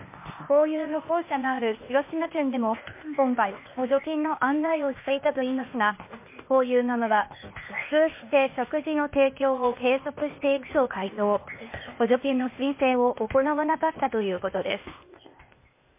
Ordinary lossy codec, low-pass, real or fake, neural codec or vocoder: MP3, 24 kbps; 3.6 kHz; fake; codec, 16 kHz, 0.8 kbps, ZipCodec